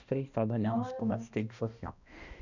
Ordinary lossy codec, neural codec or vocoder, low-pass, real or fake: none; codec, 16 kHz, 1 kbps, X-Codec, HuBERT features, trained on balanced general audio; 7.2 kHz; fake